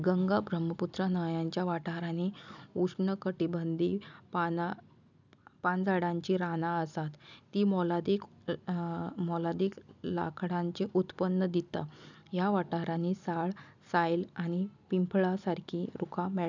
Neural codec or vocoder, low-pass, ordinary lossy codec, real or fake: none; 7.2 kHz; none; real